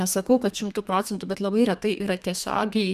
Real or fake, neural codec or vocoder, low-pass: fake; codec, 32 kHz, 1.9 kbps, SNAC; 14.4 kHz